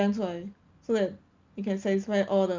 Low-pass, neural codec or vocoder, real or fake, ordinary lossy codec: 7.2 kHz; none; real; Opus, 24 kbps